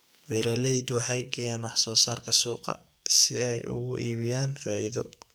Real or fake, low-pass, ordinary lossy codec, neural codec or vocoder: fake; none; none; codec, 44.1 kHz, 2.6 kbps, SNAC